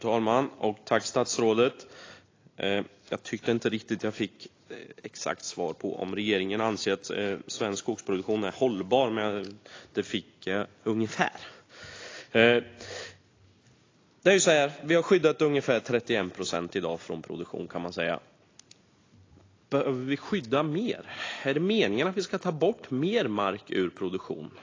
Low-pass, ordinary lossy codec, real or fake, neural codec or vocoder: 7.2 kHz; AAC, 32 kbps; real; none